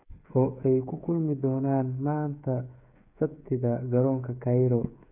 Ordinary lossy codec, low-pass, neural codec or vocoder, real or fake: none; 3.6 kHz; codec, 16 kHz, 8 kbps, FreqCodec, smaller model; fake